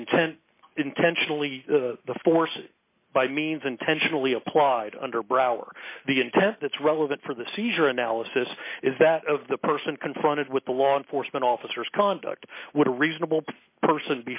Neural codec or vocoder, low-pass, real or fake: none; 3.6 kHz; real